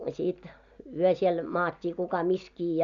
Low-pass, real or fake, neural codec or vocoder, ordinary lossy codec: 7.2 kHz; real; none; none